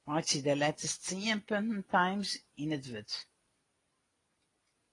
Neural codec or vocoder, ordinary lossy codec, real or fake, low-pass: none; AAC, 32 kbps; real; 10.8 kHz